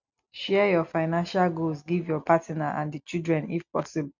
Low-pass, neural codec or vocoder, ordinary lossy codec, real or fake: 7.2 kHz; none; none; real